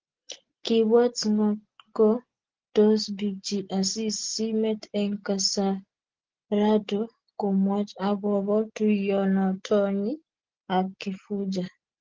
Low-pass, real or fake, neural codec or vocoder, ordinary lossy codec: 7.2 kHz; real; none; Opus, 16 kbps